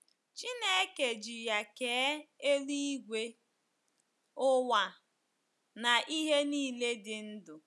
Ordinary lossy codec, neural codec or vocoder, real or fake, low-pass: none; none; real; none